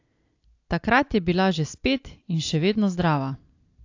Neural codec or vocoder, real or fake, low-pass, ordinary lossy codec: none; real; 7.2 kHz; AAC, 48 kbps